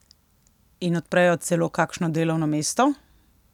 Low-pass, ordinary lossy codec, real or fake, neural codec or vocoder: 19.8 kHz; none; real; none